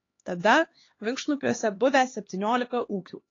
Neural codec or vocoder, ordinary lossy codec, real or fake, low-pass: codec, 16 kHz, 2 kbps, X-Codec, HuBERT features, trained on LibriSpeech; AAC, 32 kbps; fake; 7.2 kHz